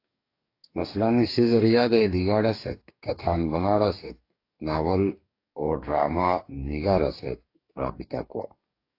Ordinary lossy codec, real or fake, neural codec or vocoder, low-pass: AAC, 32 kbps; fake; codec, 44.1 kHz, 2.6 kbps, DAC; 5.4 kHz